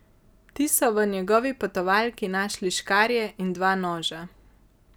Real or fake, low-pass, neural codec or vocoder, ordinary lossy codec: real; none; none; none